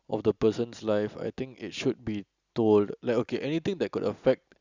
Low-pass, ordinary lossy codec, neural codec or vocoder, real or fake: 7.2 kHz; Opus, 64 kbps; none; real